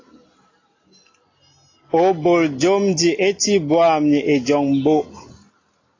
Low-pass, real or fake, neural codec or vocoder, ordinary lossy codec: 7.2 kHz; real; none; AAC, 32 kbps